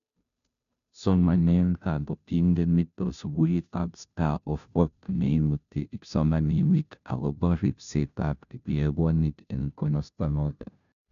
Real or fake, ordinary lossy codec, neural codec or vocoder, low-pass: fake; none; codec, 16 kHz, 0.5 kbps, FunCodec, trained on Chinese and English, 25 frames a second; 7.2 kHz